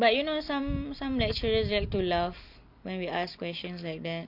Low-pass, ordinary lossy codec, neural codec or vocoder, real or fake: 5.4 kHz; none; none; real